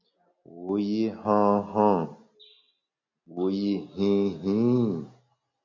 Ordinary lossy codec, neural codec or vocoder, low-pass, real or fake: MP3, 48 kbps; none; 7.2 kHz; real